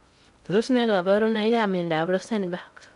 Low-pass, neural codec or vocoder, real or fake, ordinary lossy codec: 10.8 kHz; codec, 16 kHz in and 24 kHz out, 0.6 kbps, FocalCodec, streaming, 2048 codes; fake; none